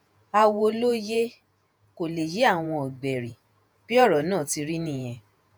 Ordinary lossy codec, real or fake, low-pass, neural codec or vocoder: none; fake; none; vocoder, 48 kHz, 128 mel bands, Vocos